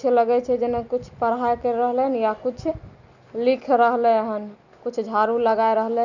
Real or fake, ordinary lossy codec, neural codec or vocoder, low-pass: real; none; none; 7.2 kHz